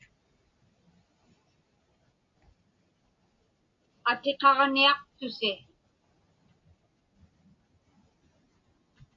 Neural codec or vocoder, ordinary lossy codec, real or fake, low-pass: none; AAC, 48 kbps; real; 7.2 kHz